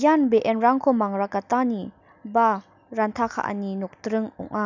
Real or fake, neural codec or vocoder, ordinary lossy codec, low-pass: real; none; none; 7.2 kHz